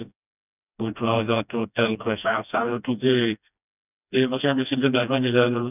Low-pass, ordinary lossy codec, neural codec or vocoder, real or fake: 3.6 kHz; none; codec, 16 kHz, 1 kbps, FreqCodec, smaller model; fake